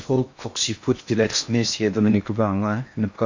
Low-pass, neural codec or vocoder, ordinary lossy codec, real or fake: 7.2 kHz; codec, 16 kHz in and 24 kHz out, 0.6 kbps, FocalCodec, streaming, 4096 codes; AAC, 48 kbps; fake